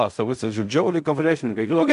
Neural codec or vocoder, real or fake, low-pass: codec, 16 kHz in and 24 kHz out, 0.4 kbps, LongCat-Audio-Codec, fine tuned four codebook decoder; fake; 10.8 kHz